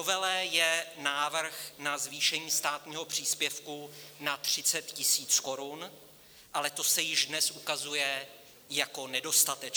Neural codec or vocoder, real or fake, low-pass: none; real; 19.8 kHz